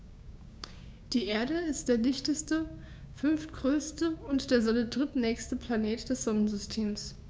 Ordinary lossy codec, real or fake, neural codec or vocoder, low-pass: none; fake; codec, 16 kHz, 6 kbps, DAC; none